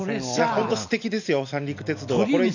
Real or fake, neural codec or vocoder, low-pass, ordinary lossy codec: real; none; 7.2 kHz; none